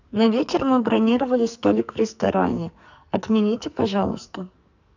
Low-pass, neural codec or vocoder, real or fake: 7.2 kHz; codec, 44.1 kHz, 2.6 kbps, SNAC; fake